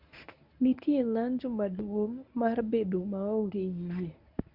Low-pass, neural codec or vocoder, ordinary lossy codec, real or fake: 5.4 kHz; codec, 24 kHz, 0.9 kbps, WavTokenizer, medium speech release version 1; none; fake